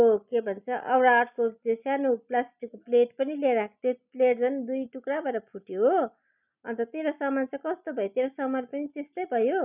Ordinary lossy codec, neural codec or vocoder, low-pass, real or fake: none; none; 3.6 kHz; real